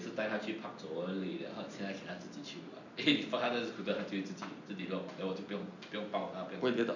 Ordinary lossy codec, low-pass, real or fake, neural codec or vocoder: none; 7.2 kHz; real; none